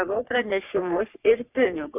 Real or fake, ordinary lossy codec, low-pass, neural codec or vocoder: fake; AAC, 24 kbps; 3.6 kHz; codec, 44.1 kHz, 3.4 kbps, Pupu-Codec